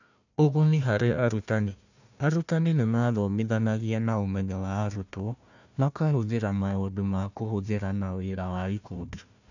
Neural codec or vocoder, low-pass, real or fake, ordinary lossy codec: codec, 16 kHz, 1 kbps, FunCodec, trained on Chinese and English, 50 frames a second; 7.2 kHz; fake; none